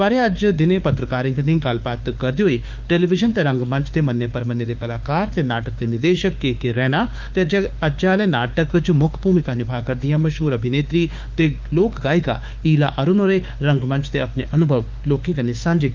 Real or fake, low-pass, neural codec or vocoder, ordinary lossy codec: fake; 7.2 kHz; autoencoder, 48 kHz, 32 numbers a frame, DAC-VAE, trained on Japanese speech; Opus, 24 kbps